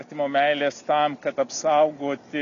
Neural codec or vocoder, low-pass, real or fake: none; 7.2 kHz; real